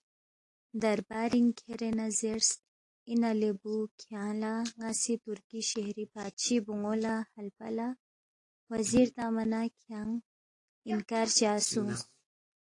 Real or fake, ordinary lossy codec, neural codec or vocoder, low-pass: real; AAC, 48 kbps; none; 10.8 kHz